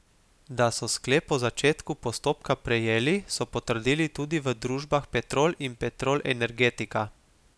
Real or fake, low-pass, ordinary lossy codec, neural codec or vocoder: real; none; none; none